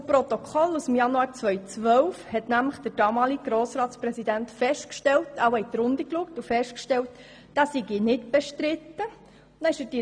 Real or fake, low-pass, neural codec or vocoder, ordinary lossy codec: real; 9.9 kHz; none; MP3, 96 kbps